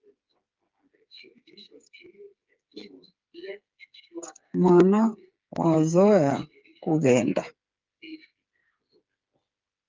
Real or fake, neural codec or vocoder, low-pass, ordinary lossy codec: fake; codec, 16 kHz, 8 kbps, FreqCodec, smaller model; 7.2 kHz; Opus, 24 kbps